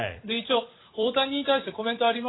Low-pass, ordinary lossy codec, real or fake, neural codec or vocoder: 7.2 kHz; AAC, 16 kbps; fake; vocoder, 44.1 kHz, 128 mel bands every 256 samples, BigVGAN v2